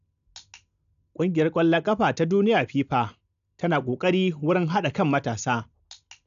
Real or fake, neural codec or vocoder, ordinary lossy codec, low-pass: real; none; none; 7.2 kHz